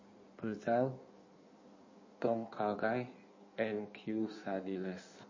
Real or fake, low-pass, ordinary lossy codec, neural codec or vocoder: fake; 7.2 kHz; MP3, 32 kbps; codec, 16 kHz in and 24 kHz out, 1.1 kbps, FireRedTTS-2 codec